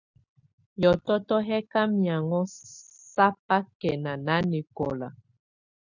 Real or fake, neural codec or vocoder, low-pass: real; none; 7.2 kHz